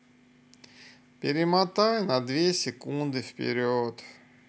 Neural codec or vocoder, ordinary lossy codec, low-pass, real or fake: none; none; none; real